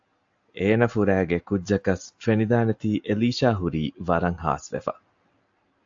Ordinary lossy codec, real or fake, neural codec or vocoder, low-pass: AAC, 64 kbps; real; none; 7.2 kHz